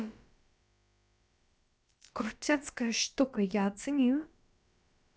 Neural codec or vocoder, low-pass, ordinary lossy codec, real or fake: codec, 16 kHz, about 1 kbps, DyCAST, with the encoder's durations; none; none; fake